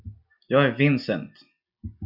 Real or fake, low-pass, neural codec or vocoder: real; 5.4 kHz; none